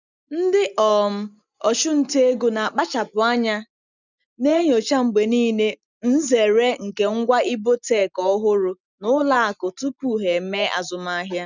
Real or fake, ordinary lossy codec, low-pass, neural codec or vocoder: real; none; 7.2 kHz; none